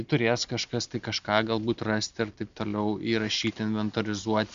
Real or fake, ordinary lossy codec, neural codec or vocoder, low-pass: real; Opus, 64 kbps; none; 7.2 kHz